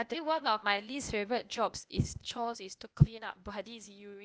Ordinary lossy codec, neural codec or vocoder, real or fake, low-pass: none; codec, 16 kHz, 0.8 kbps, ZipCodec; fake; none